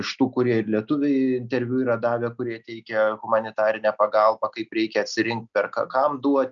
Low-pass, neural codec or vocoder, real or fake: 7.2 kHz; none; real